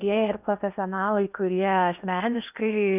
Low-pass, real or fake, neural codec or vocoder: 3.6 kHz; fake; codec, 16 kHz in and 24 kHz out, 0.8 kbps, FocalCodec, streaming, 65536 codes